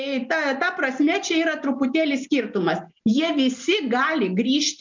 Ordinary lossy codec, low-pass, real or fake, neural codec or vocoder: MP3, 64 kbps; 7.2 kHz; real; none